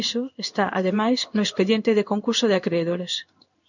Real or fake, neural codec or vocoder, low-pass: fake; codec, 16 kHz in and 24 kHz out, 1 kbps, XY-Tokenizer; 7.2 kHz